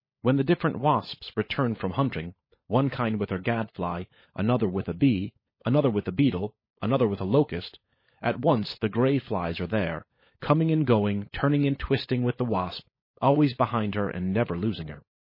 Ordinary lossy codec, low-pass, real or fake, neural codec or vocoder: MP3, 24 kbps; 5.4 kHz; fake; codec, 16 kHz, 16 kbps, FunCodec, trained on LibriTTS, 50 frames a second